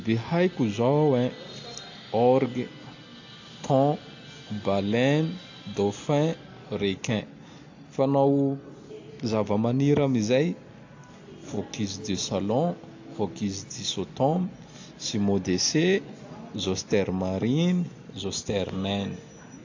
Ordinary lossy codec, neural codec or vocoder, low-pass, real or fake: AAC, 48 kbps; none; 7.2 kHz; real